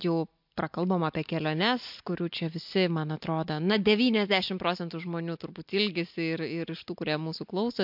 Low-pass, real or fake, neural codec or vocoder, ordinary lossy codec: 5.4 kHz; real; none; MP3, 48 kbps